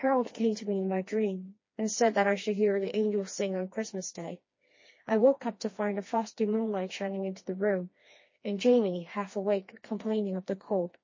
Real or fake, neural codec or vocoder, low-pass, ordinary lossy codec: fake; codec, 16 kHz, 2 kbps, FreqCodec, smaller model; 7.2 kHz; MP3, 32 kbps